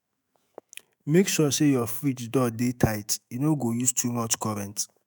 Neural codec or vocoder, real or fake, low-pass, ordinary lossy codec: autoencoder, 48 kHz, 128 numbers a frame, DAC-VAE, trained on Japanese speech; fake; none; none